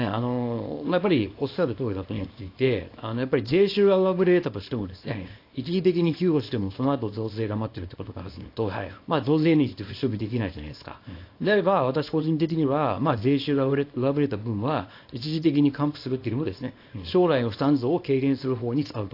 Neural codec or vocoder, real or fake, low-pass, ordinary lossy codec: codec, 24 kHz, 0.9 kbps, WavTokenizer, small release; fake; 5.4 kHz; AAC, 32 kbps